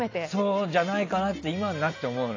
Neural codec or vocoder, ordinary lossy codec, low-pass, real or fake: none; AAC, 32 kbps; 7.2 kHz; real